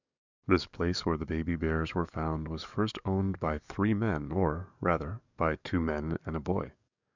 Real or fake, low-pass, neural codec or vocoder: fake; 7.2 kHz; codec, 44.1 kHz, 7.8 kbps, DAC